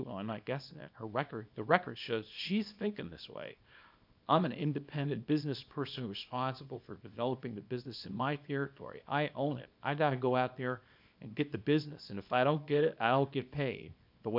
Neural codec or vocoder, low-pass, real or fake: codec, 24 kHz, 0.9 kbps, WavTokenizer, small release; 5.4 kHz; fake